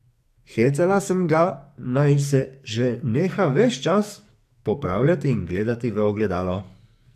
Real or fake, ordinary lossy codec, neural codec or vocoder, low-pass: fake; AAC, 96 kbps; codec, 44.1 kHz, 2.6 kbps, SNAC; 14.4 kHz